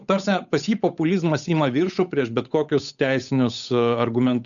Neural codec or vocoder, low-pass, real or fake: codec, 16 kHz, 8 kbps, FunCodec, trained on Chinese and English, 25 frames a second; 7.2 kHz; fake